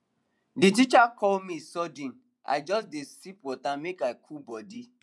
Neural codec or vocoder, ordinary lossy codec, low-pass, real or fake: vocoder, 24 kHz, 100 mel bands, Vocos; none; none; fake